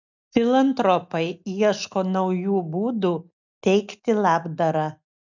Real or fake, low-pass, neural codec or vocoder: real; 7.2 kHz; none